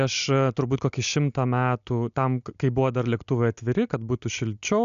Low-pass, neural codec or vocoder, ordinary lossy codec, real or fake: 7.2 kHz; none; AAC, 96 kbps; real